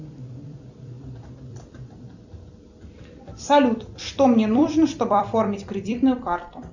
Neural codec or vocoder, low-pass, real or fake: vocoder, 44.1 kHz, 128 mel bands every 256 samples, BigVGAN v2; 7.2 kHz; fake